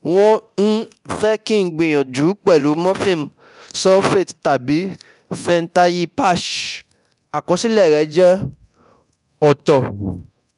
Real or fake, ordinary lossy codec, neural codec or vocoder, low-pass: fake; none; codec, 24 kHz, 0.9 kbps, DualCodec; 10.8 kHz